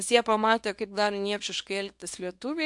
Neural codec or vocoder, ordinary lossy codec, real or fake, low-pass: codec, 24 kHz, 0.9 kbps, WavTokenizer, small release; MP3, 64 kbps; fake; 10.8 kHz